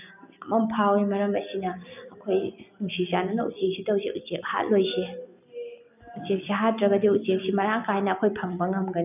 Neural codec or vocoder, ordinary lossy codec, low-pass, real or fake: none; none; 3.6 kHz; real